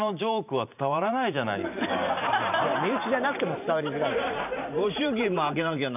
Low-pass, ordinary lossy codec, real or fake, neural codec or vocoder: 3.6 kHz; none; fake; vocoder, 44.1 kHz, 128 mel bands every 512 samples, BigVGAN v2